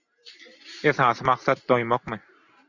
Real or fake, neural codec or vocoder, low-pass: real; none; 7.2 kHz